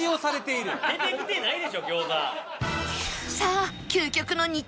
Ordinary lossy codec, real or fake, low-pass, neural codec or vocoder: none; real; none; none